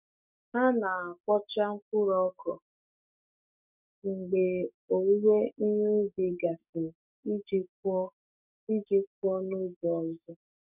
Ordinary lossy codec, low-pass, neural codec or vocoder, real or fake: none; 3.6 kHz; codec, 44.1 kHz, 7.8 kbps, DAC; fake